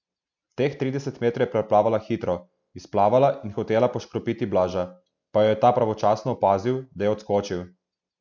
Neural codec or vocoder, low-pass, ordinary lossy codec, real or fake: none; 7.2 kHz; none; real